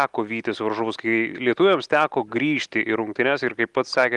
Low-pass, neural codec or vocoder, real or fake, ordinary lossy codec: 10.8 kHz; none; real; Opus, 24 kbps